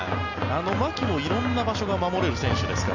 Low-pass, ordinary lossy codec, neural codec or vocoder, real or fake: 7.2 kHz; none; none; real